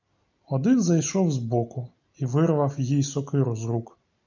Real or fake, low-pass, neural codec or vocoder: real; 7.2 kHz; none